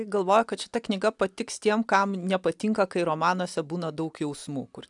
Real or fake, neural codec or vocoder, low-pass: real; none; 10.8 kHz